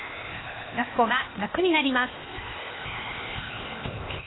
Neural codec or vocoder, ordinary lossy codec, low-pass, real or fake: codec, 16 kHz, 0.8 kbps, ZipCodec; AAC, 16 kbps; 7.2 kHz; fake